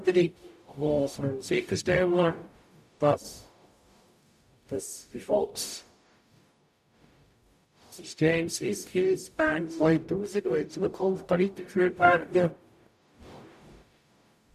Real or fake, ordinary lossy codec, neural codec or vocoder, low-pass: fake; none; codec, 44.1 kHz, 0.9 kbps, DAC; 14.4 kHz